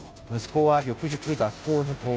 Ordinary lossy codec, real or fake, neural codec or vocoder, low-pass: none; fake; codec, 16 kHz, 0.5 kbps, FunCodec, trained on Chinese and English, 25 frames a second; none